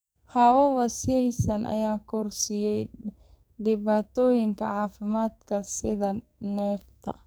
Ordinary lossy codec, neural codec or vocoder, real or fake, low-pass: none; codec, 44.1 kHz, 2.6 kbps, SNAC; fake; none